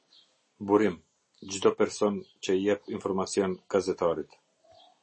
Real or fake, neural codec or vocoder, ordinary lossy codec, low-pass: real; none; MP3, 32 kbps; 9.9 kHz